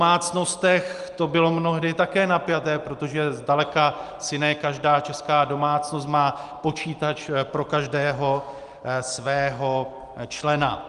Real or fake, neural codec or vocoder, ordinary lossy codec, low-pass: real; none; Opus, 32 kbps; 10.8 kHz